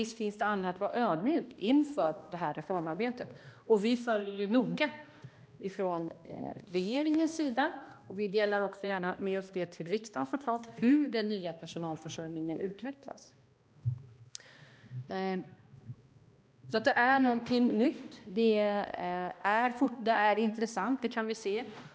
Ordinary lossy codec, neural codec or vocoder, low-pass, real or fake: none; codec, 16 kHz, 1 kbps, X-Codec, HuBERT features, trained on balanced general audio; none; fake